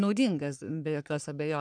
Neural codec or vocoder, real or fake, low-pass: codec, 44.1 kHz, 3.4 kbps, Pupu-Codec; fake; 9.9 kHz